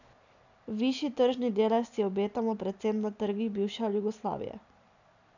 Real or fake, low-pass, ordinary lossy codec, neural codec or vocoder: real; 7.2 kHz; none; none